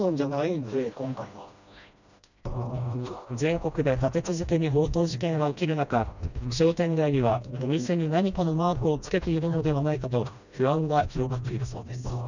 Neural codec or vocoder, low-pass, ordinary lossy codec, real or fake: codec, 16 kHz, 1 kbps, FreqCodec, smaller model; 7.2 kHz; none; fake